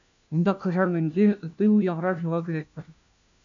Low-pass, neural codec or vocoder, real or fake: 7.2 kHz; codec, 16 kHz, 1 kbps, FunCodec, trained on LibriTTS, 50 frames a second; fake